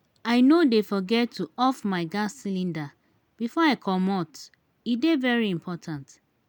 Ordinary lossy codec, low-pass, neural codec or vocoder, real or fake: none; none; none; real